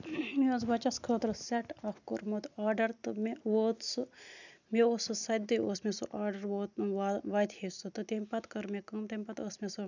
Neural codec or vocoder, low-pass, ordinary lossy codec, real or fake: none; 7.2 kHz; none; real